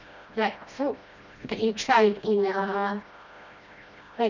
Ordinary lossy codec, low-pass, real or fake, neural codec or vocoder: none; 7.2 kHz; fake; codec, 16 kHz, 1 kbps, FreqCodec, smaller model